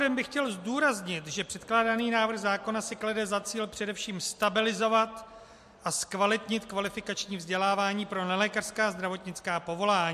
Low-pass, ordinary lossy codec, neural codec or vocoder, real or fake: 14.4 kHz; MP3, 64 kbps; none; real